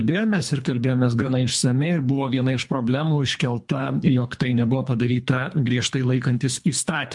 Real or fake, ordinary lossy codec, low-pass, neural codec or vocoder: fake; MP3, 64 kbps; 10.8 kHz; codec, 24 kHz, 3 kbps, HILCodec